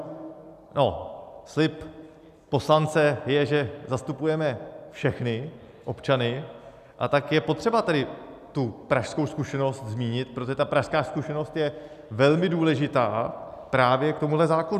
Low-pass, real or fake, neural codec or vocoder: 14.4 kHz; real; none